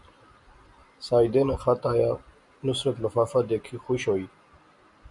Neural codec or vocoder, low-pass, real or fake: none; 10.8 kHz; real